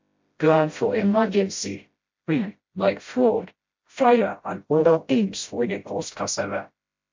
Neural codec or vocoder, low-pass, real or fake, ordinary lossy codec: codec, 16 kHz, 0.5 kbps, FreqCodec, smaller model; 7.2 kHz; fake; MP3, 48 kbps